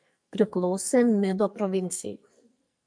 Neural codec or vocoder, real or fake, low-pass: codec, 44.1 kHz, 2.6 kbps, SNAC; fake; 9.9 kHz